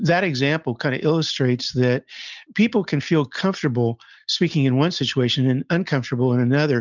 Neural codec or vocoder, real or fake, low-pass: none; real; 7.2 kHz